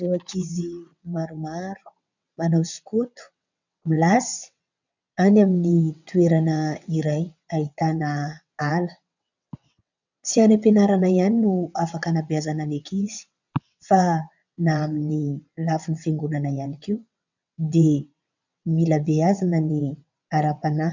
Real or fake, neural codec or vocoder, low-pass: fake; vocoder, 22.05 kHz, 80 mel bands, WaveNeXt; 7.2 kHz